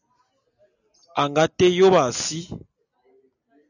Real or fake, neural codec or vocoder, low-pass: real; none; 7.2 kHz